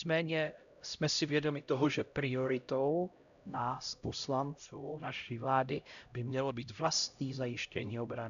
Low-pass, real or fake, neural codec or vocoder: 7.2 kHz; fake; codec, 16 kHz, 0.5 kbps, X-Codec, HuBERT features, trained on LibriSpeech